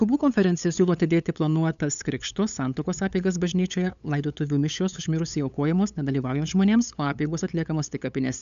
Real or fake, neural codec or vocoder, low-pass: fake; codec, 16 kHz, 8 kbps, FunCodec, trained on LibriTTS, 25 frames a second; 7.2 kHz